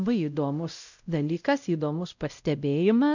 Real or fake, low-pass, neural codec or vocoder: fake; 7.2 kHz; codec, 16 kHz, 0.5 kbps, X-Codec, WavLM features, trained on Multilingual LibriSpeech